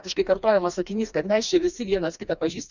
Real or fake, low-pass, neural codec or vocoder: fake; 7.2 kHz; codec, 16 kHz, 2 kbps, FreqCodec, smaller model